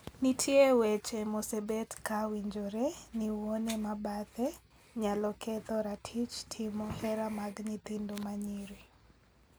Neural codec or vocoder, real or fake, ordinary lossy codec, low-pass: none; real; none; none